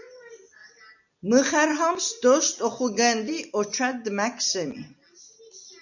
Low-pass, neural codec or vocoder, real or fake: 7.2 kHz; none; real